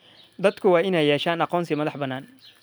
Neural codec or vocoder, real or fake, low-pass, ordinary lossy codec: none; real; none; none